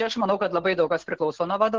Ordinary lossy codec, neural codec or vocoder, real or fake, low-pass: Opus, 16 kbps; none; real; 7.2 kHz